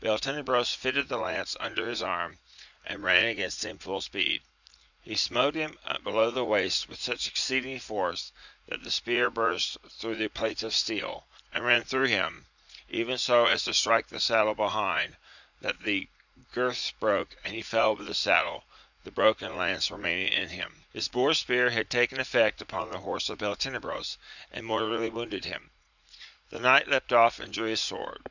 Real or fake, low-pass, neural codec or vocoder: fake; 7.2 kHz; vocoder, 44.1 kHz, 80 mel bands, Vocos